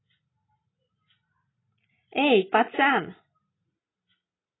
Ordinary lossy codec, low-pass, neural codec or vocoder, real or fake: AAC, 16 kbps; 7.2 kHz; none; real